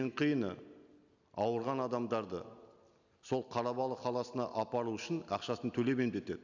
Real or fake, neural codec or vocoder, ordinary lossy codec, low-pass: real; none; none; 7.2 kHz